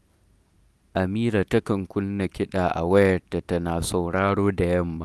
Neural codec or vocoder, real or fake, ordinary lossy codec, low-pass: none; real; none; none